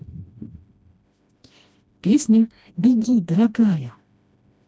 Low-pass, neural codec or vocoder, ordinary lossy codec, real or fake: none; codec, 16 kHz, 1 kbps, FreqCodec, smaller model; none; fake